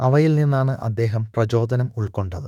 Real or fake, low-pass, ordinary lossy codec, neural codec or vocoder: fake; 19.8 kHz; none; autoencoder, 48 kHz, 32 numbers a frame, DAC-VAE, trained on Japanese speech